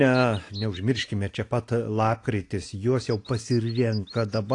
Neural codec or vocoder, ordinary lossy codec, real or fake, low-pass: none; AAC, 48 kbps; real; 10.8 kHz